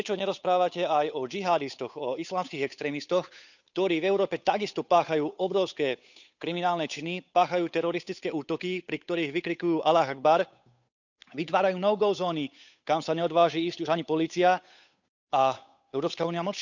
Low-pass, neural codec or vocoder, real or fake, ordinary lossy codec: 7.2 kHz; codec, 16 kHz, 8 kbps, FunCodec, trained on Chinese and English, 25 frames a second; fake; none